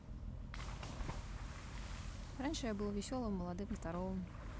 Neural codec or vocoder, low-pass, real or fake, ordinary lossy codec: none; none; real; none